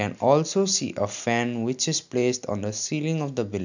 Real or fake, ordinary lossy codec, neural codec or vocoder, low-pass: real; none; none; 7.2 kHz